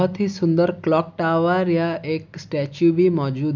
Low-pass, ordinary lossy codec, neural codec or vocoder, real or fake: 7.2 kHz; none; none; real